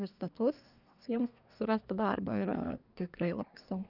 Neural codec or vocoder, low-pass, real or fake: codec, 24 kHz, 1 kbps, SNAC; 5.4 kHz; fake